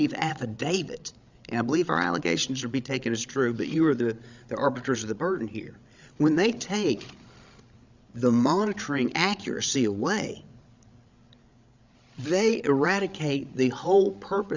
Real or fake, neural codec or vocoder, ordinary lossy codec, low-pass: fake; codec, 16 kHz, 16 kbps, FreqCodec, larger model; Opus, 64 kbps; 7.2 kHz